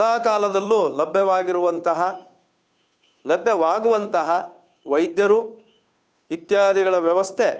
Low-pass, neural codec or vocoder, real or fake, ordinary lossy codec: none; codec, 16 kHz, 2 kbps, FunCodec, trained on Chinese and English, 25 frames a second; fake; none